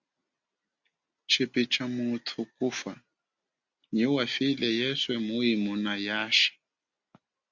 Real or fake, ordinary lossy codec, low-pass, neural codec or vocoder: real; Opus, 64 kbps; 7.2 kHz; none